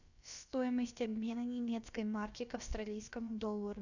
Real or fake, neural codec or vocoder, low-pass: fake; codec, 16 kHz, about 1 kbps, DyCAST, with the encoder's durations; 7.2 kHz